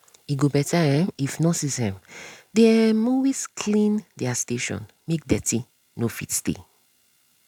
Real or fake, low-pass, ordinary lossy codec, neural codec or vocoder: real; 19.8 kHz; none; none